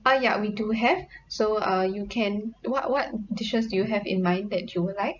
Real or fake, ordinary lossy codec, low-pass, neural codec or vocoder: real; none; 7.2 kHz; none